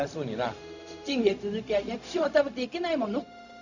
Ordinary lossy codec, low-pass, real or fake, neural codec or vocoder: none; 7.2 kHz; fake; codec, 16 kHz, 0.4 kbps, LongCat-Audio-Codec